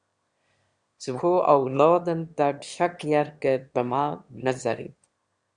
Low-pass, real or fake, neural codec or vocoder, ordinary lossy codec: 9.9 kHz; fake; autoencoder, 22.05 kHz, a latent of 192 numbers a frame, VITS, trained on one speaker; Opus, 64 kbps